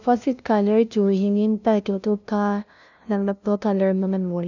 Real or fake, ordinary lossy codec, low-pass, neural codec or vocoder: fake; none; 7.2 kHz; codec, 16 kHz, 0.5 kbps, FunCodec, trained on LibriTTS, 25 frames a second